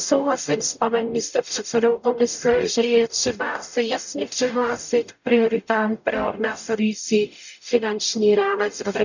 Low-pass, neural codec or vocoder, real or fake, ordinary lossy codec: 7.2 kHz; codec, 44.1 kHz, 0.9 kbps, DAC; fake; none